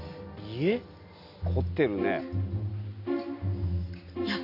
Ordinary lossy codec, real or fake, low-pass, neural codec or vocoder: none; real; 5.4 kHz; none